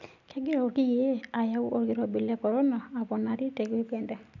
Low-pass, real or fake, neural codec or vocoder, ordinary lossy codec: 7.2 kHz; real; none; none